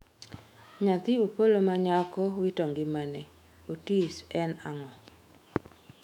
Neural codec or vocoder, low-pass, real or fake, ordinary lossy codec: autoencoder, 48 kHz, 128 numbers a frame, DAC-VAE, trained on Japanese speech; 19.8 kHz; fake; none